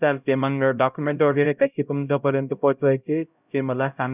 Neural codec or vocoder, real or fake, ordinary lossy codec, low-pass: codec, 16 kHz, 0.5 kbps, X-Codec, HuBERT features, trained on LibriSpeech; fake; none; 3.6 kHz